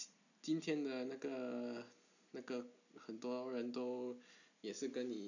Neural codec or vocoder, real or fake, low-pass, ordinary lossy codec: none; real; 7.2 kHz; none